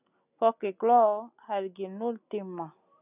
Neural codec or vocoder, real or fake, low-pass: none; real; 3.6 kHz